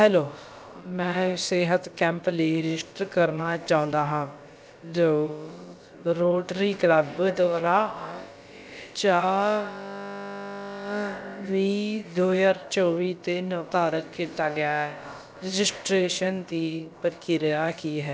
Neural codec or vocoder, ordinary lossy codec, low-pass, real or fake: codec, 16 kHz, about 1 kbps, DyCAST, with the encoder's durations; none; none; fake